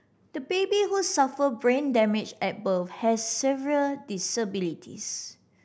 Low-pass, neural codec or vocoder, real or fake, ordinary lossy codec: none; none; real; none